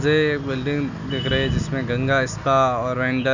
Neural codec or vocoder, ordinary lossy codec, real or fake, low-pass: none; none; real; 7.2 kHz